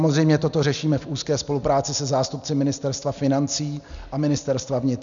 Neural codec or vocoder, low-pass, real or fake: none; 7.2 kHz; real